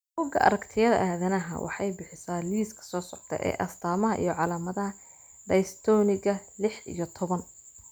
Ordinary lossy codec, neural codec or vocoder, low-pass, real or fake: none; none; none; real